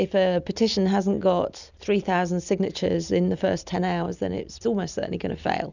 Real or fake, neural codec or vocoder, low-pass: real; none; 7.2 kHz